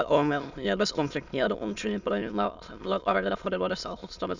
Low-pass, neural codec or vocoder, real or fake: 7.2 kHz; autoencoder, 22.05 kHz, a latent of 192 numbers a frame, VITS, trained on many speakers; fake